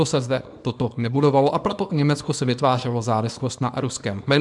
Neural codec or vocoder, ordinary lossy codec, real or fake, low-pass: codec, 24 kHz, 0.9 kbps, WavTokenizer, small release; MP3, 96 kbps; fake; 10.8 kHz